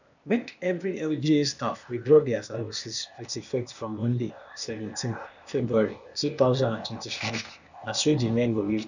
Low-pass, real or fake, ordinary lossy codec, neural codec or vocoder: 7.2 kHz; fake; none; codec, 16 kHz, 0.8 kbps, ZipCodec